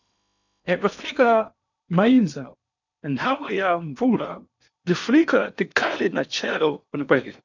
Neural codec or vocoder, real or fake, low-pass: codec, 16 kHz in and 24 kHz out, 0.8 kbps, FocalCodec, streaming, 65536 codes; fake; 7.2 kHz